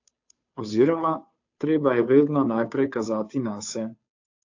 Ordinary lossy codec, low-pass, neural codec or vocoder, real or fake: AAC, 48 kbps; 7.2 kHz; codec, 16 kHz, 2 kbps, FunCodec, trained on Chinese and English, 25 frames a second; fake